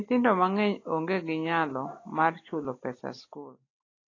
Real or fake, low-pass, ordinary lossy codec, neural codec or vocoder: real; 7.2 kHz; AAC, 32 kbps; none